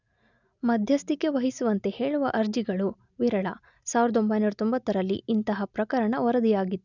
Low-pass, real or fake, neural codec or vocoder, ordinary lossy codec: 7.2 kHz; real; none; none